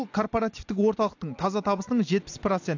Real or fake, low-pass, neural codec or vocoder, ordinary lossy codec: real; 7.2 kHz; none; AAC, 48 kbps